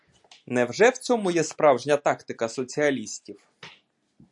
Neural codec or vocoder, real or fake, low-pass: none; real; 10.8 kHz